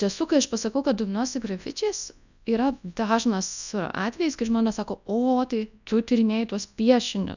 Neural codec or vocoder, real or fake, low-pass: codec, 24 kHz, 0.9 kbps, WavTokenizer, large speech release; fake; 7.2 kHz